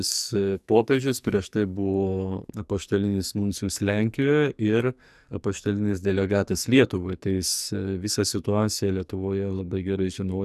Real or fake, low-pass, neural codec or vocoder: fake; 14.4 kHz; codec, 44.1 kHz, 2.6 kbps, SNAC